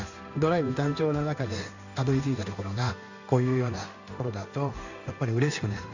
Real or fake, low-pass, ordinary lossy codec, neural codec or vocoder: fake; 7.2 kHz; none; codec, 16 kHz in and 24 kHz out, 1 kbps, XY-Tokenizer